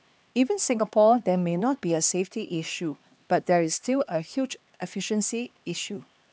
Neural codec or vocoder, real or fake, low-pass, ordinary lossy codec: codec, 16 kHz, 2 kbps, X-Codec, HuBERT features, trained on LibriSpeech; fake; none; none